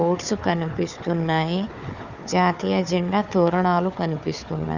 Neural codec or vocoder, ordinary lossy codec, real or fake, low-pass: codec, 16 kHz, 4 kbps, FunCodec, trained on Chinese and English, 50 frames a second; none; fake; 7.2 kHz